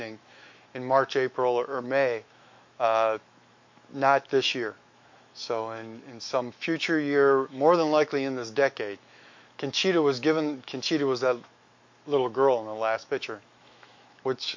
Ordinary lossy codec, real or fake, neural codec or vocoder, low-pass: MP3, 48 kbps; fake; autoencoder, 48 kHz, 128 numbers a frame, DAC-VAE, trained on Japanese speech; 7.2 kHz